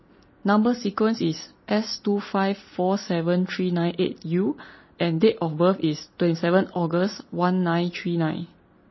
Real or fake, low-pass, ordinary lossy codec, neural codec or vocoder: real; 7.2 kHz; MP3, 24 kbps; none